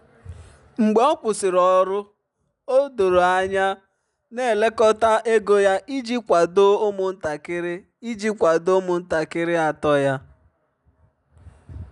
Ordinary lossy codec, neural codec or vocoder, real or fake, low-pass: none; none; real; 10.8 kHz